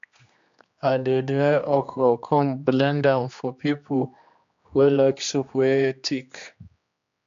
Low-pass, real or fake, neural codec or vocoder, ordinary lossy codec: 7.2 kHz; fake; codec, 16 kHz, 2 kbps, X-Codec, HuBERT features, trained on general audio; MP3, 64 kbps